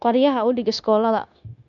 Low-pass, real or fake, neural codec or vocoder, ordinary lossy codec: 7.2 kHz; fake; codec, 16 kHz, 0.9 kbps, LongCat-Audio-Codec; none